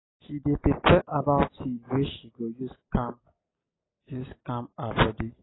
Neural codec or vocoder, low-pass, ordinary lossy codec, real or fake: none; 7.2 kHz; AAC, 16 kbps; real